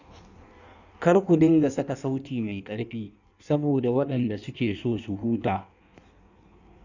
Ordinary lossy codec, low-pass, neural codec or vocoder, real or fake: none; 7.2 kHz; codec, 16 kHz in and 24 kHz out, 1.1 kbps, FireRedTTS-2 codec; fake